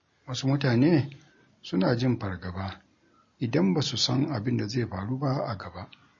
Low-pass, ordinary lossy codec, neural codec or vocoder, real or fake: 7.2 kHz; MP3, 32 kbps; none; real